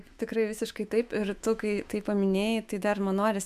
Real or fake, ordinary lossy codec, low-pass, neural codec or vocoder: fake; AAC, 96 kbps; 14.4 kHz; autoencoder, 48 kHz, 128 numbers a frame, DAC-VAE, trained on Japanese speech